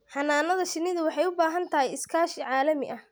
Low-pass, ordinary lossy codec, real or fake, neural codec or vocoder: none; none; real; none